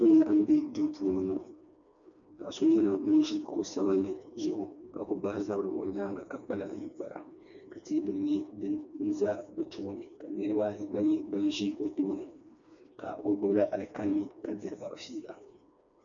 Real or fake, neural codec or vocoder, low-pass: fake; codec, 16 kHz, 2 kbps, FreqCodec, smaller model; 7.2 kHz